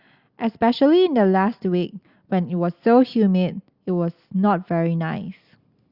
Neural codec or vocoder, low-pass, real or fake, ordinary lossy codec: none; 5.4 kHz; real; Opus, 64 kbps